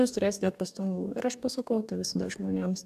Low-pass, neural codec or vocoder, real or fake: 14.4 kHz; codec, 44.1 kHz, 2.6 kbps, DAC; fake